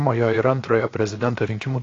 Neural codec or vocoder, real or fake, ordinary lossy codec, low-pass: codec, 16 kHz, 0.7 kbps, FocalCodec; fake; AAC, 32 kbps; 7.2 kHz